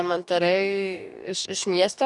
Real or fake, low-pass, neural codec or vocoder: fake; 10.8 kHz; codec, 44.1 kHz, 2.6 kbps, DAC